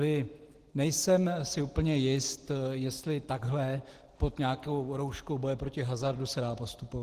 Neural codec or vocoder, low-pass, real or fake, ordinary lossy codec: none; 14.4 kHz; real; Opus, 16 kbps